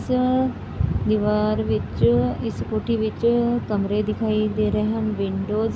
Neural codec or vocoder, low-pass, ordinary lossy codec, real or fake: none; none; none; real